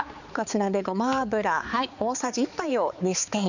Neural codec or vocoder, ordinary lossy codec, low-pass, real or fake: codec, 16 kHz, 4 kbps, X-Codec, HuBERT features, trained on balanced general audio; none; 7.2 kHz; fake